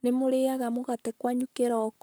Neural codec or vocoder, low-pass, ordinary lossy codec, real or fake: codec, 44.1 kHz, 7.8 kbps, Pupu-Codec; none; none; fake